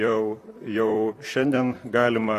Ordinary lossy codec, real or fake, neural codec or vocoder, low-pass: AAC, 48 kbps; fake; vocoder, 44.1 kHz, 128 mel bands, Pupu-Vocoder; 14.4 kHz